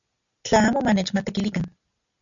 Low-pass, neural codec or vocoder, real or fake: 7.2 kHz; none; real